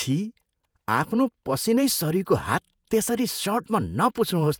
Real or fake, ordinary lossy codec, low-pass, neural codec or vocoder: fake; none; none; vocoder, 48 kHz, 128 mel bands, Vocos